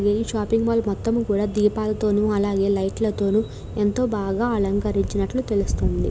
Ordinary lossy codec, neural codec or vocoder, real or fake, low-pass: none; none; real; none